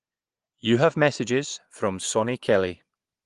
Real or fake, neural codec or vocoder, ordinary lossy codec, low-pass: fake; vocoder, 24 kHz, 100 mel bands, Vocos; Opus, 24 kbps; 10.8 kHz